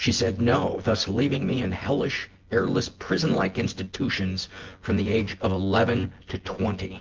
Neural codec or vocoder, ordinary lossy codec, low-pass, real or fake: vocoder, 24 kHz, 100 mel bands, Vocos; Opus, 16 kbps; 7.2 kHz; fake